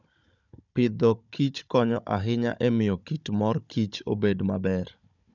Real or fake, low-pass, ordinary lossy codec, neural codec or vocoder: fake; 7.2 kHz; none; codec, 16 kHz, 16 kbps, FunCodec, trained on Chinese and English, 50 frames a second